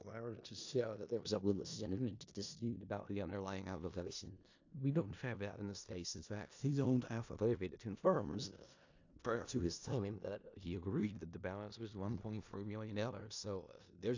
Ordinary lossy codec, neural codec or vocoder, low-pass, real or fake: AAC, 48 kbps; codec, 16 kHz in and 24 kHz out, 0.4 kbps, LongCat-Audio-Codec, four codebook decoder; 7.2 kHz; fake